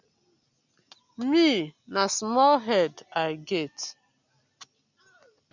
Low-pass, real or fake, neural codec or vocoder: 7.2 kHz; real; none